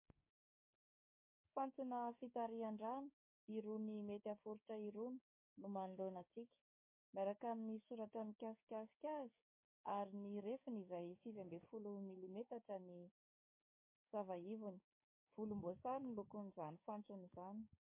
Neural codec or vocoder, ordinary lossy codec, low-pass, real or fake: codec, 16 kHz, 6 kbps, DAC; MP3, 24 kbps; 3.6 kHz; fake